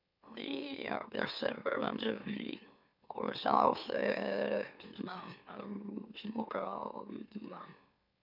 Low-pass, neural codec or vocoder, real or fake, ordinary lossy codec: 5.4 kHz; autoencoder, 44.1 kHz, a latent of 192 numbers a frame, MeloTTS; fake; none